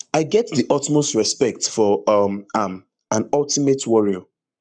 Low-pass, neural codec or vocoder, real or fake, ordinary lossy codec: 9.9 kHz; codec, 44.1 kHz, 7.8 kbps, Pupu-Codec; fake; none